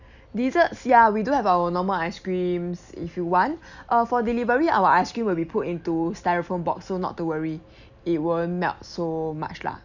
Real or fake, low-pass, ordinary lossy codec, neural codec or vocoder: real; 7.2 kHz; none; none